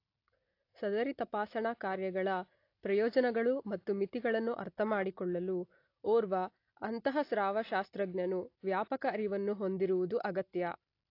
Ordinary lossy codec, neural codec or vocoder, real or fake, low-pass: AAC, 32 kbps; none; real; 5.4 kHz